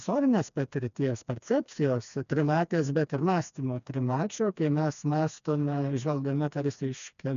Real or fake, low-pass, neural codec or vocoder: fake; 7.2 kHz; codec, 16 kHz, 2 kbps, FreqCodec, smaller model